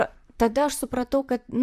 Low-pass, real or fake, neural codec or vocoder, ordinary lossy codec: 14.4 kHz; fake; vocoder, 44.1 kHz, 128 mel bands, Pupu-Vocoder; AAC, 96 kbps